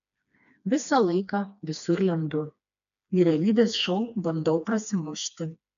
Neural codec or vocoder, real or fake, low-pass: codec, 16 kHz, 2 kbps, FreqCodec, smaller model; fake; 7.2 kHz